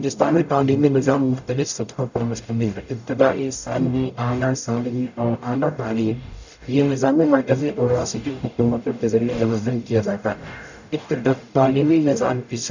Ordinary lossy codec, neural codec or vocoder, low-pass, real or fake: none; codec, 44.1 kHz, 0.9 kbps, DAC; 7.2 kHz; fake